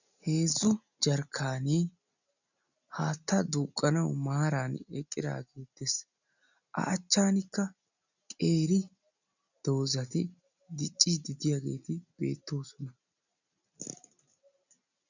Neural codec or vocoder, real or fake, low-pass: none; real; 7.2 kHz